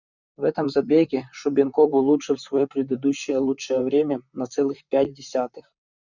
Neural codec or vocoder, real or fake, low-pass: vocoder, 44.1 kHz, 128 mel bands, Pupu-Vocoder; fake; 7.2 kHz